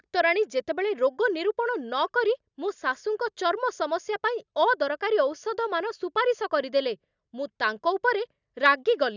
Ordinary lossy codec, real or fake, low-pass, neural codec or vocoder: none; real; 7.2 kHz; none